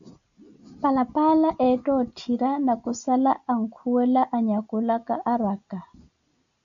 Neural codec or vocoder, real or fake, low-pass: none; real; 7.2 kHz